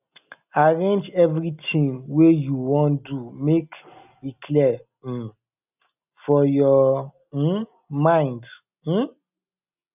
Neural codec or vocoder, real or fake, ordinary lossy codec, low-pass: none; real; none; 3.6 kHz